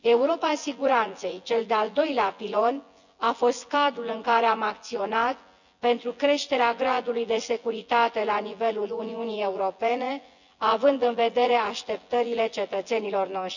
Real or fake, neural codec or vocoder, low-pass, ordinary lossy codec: fake; vocoder, 24 kHz, 100 mel bands, Vocos; 7.2 kHz; none